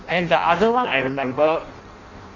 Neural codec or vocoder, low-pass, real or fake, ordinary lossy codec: codec, 16 kHz in and 24 kHz out, 0.6 kbps, FireRedTTS-2 codec; 7.2 kHz; fake; Opus, 64 kbps